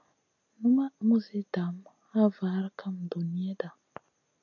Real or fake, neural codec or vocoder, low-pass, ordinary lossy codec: real; none; 7.2 kHz; AAC, 48 kbps